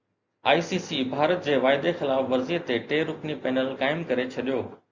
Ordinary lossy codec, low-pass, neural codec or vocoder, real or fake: Opus, 64 kbps; 7.2 kHz; none; real